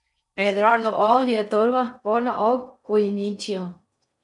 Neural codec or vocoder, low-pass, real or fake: codec, 16 kHz in and 24 kHz out, 0.6 kbps, FocalCodec, streaming, 4096 codes; 10.8 kHz; fake